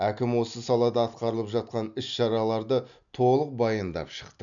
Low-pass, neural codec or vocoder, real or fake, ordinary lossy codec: 7.2 kHz; none; real; none